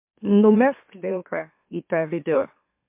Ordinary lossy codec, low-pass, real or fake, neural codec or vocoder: MP3, 32 kbps; 3.6 kHz; fake; autoencoder, 44.1 kHz, a latent of 192 numbers a frame, MeloTTS